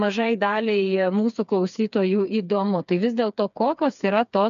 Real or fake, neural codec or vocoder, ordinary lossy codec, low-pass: fake; codec, 16 kHz, 4 kbps, FreqCodec, smaller model; MP3, 96 kbps; 7.2 kHz